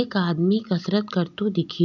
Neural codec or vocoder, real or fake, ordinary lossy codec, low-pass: none; real; none; 7.2 kHz